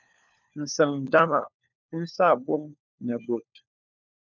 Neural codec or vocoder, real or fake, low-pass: codec, 16 kHz, 4 kbps, FunCodec, trained on LibriTTS, 50 frames a second; fake; 7.2 kHz